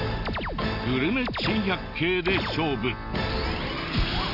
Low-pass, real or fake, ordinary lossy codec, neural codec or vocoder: 5.4 kHz; real; none; none